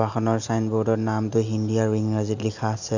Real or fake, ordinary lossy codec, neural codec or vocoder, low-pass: real; none; none; 7.2 kHz